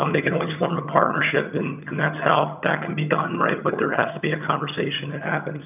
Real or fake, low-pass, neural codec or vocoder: fake; 3.6 kHz; vocoder, 22.05 kHz, 80 mel bands, HiFi-GAN